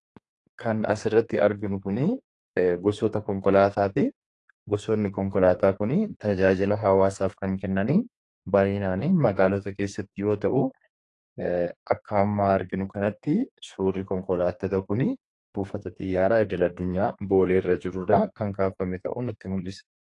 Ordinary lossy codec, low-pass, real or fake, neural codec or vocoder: AAC, 48 kbps; 10.8 kHz; fake; codec, 32 kHz, 1.9 kbps, SNAC